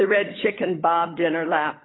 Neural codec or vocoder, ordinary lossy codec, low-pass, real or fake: codec, 16 kHz, 16 kbps, FunCodec, trained on LibriTTS, 50 frames a second; AAC, 16 kbps; 7.2 kHz; fake